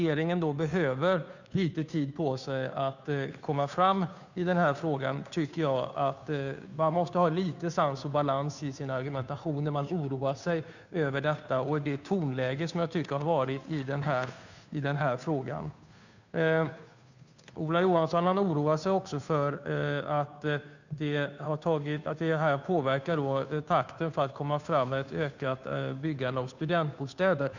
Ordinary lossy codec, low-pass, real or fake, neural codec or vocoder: none; 7.2 kHz; fake; codec, 16 kHz, 2 kbps, FunCodec, trained on Chinese and English, 25 frames a second